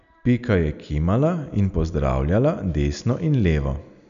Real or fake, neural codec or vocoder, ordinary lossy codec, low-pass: real; none; none; 7.2 kHz